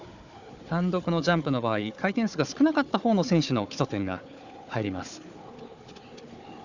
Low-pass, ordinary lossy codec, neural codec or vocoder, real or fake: 7.2 kHz; none; codec, 16 kHz, 4 kbps, FunCodec, trained on Chinese and English, 50 frames a second; fake